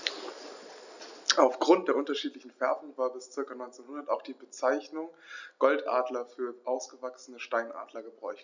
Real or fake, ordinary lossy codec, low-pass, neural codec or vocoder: real; none; 7.2 kHz; none